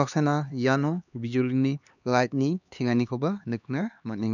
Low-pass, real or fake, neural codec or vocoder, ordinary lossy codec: 7.2 kHz; fake; codec, 16 kHz, 2 kbps, X-Codec, HuBERT features, trained on LibriSpeech; none